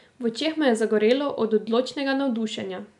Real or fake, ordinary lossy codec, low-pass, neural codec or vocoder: real; none; 10.8 kHz; none